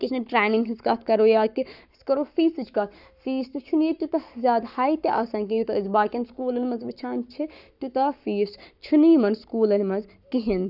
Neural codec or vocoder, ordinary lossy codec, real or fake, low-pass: codec, 44.1 kHz, 7.8 kbps, Pupu-Codec; none; fake; 5.4 kHz